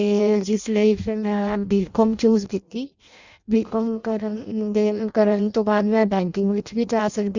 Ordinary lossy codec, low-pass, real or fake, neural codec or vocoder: Opus, 64 kbps; 7.2 kHz; fake; codec, 16 kHz in and 24 kHz out, 0.6 kbps, FireRedTTS-2 codec